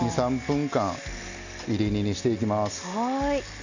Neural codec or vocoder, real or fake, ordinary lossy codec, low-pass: none; real; none; 7.2 kHz